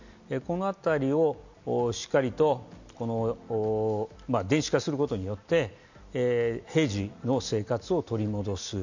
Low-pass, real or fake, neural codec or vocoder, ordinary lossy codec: 7.2 kHz; real; none; none